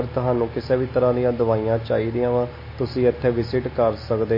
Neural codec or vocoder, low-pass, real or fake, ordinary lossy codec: none; 5.4 kHz; real; MP3, 24 kbps